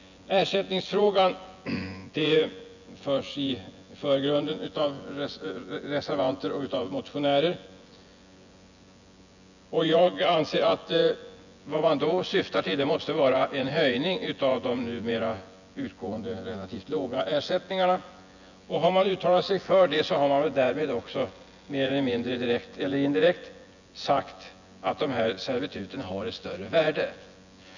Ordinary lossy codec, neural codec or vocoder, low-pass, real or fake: none; vocoder, 24 kHz, 100 mel bands, Vocos; 7.2 kHz; fake